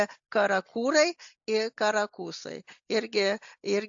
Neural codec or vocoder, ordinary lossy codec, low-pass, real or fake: none; AAC, 64 kbps; 7.2 kHz; real